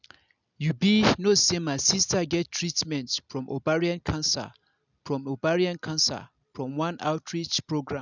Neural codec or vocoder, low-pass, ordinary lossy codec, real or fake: none; 7.2 kHz; none; real